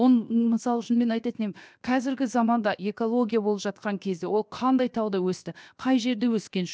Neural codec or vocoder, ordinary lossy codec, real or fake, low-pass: codec, 16 kHz, 0.7 kbps, FocalCodec; none; fake; none